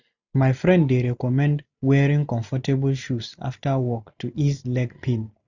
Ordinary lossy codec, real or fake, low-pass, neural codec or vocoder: none; real; 7.2 kHz; none